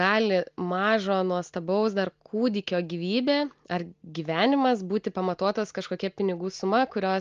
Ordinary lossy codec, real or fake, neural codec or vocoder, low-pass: Opus, 32 kbps; real; none; 7.2 kHz